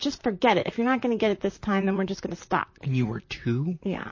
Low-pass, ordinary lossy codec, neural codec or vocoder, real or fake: 7.2 kHz; MP3, 32 kbps; vocoder, 44.1 kHz, 128 mel bands, Pupu-Vocoder; fake